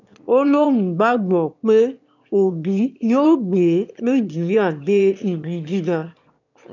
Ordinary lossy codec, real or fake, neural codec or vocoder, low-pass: none; fake; autoencoder, 22.05 kHz, a latent of 192 numbers a frame, VITS, trained on one speaker; 7.2 kHz